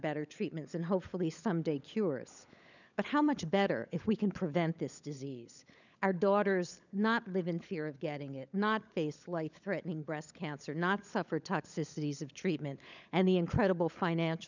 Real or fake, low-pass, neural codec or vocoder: fake; 7.2 kHz; codec, 16 kHz, 16 kbps, FunCodec, trained on LibriTTS, 50 frames a second